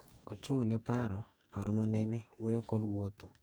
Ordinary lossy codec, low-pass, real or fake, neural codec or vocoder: none; none; fake; codec, 44.1 kHz, 2.6 kbps, DAC